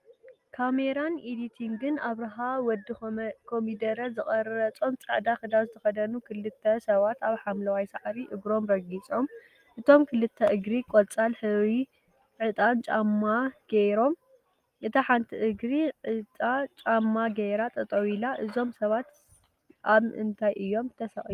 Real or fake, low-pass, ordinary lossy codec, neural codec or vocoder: real; 14.4 kHz; Opus, 32 kbps; none